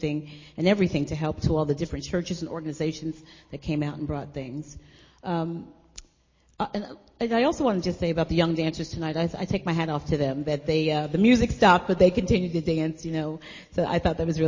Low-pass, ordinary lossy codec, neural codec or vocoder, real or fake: 7.2 kHz; MP3, 32 kbps; none; real